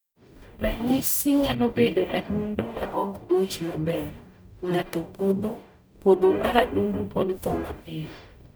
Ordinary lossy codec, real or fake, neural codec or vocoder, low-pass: none; fake; codec, 44.1 kHz, 0.9 kbps, DAC; none